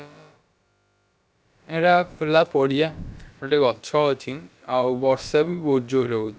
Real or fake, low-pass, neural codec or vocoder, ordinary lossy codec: fake; none; codec, 16 kHz, about 1 kbps, DyCAST, with the encoder's durations; none